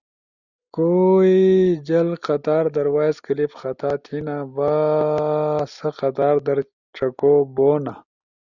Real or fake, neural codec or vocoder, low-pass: real; none; 7.2 kHz